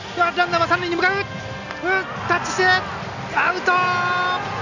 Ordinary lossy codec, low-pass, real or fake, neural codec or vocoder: none; 7.2 kHz; real; none